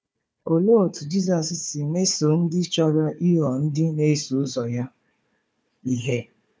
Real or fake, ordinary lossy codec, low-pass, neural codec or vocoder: fake; none; none; codec, 16 kHz, 4 kbps, FunCodec, trained on Chinese and English, 50 frames a second